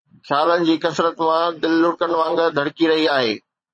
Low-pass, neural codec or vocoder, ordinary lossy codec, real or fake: 9.9 kHz; vocoder, 22.05 kHz, 80 mel bands, Vocos; MP3, 32 kbps; fake